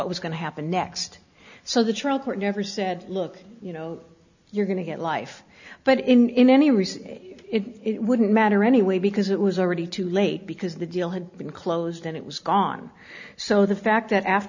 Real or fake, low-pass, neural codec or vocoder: real; 7.2 kHz; none